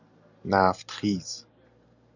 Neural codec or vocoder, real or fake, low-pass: none; real; 7.2 kHz